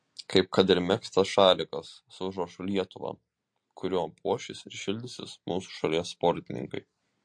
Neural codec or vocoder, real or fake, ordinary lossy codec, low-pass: none; real; MP3, 48 kbps; 9.9 kHz